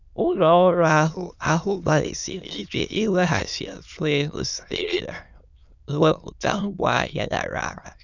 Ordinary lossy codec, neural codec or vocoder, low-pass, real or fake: none; autoencoder, 22.05 kHz, a latent of 192 numbers a frame, VITS, trained on many speakers; 7.2 kHz; fake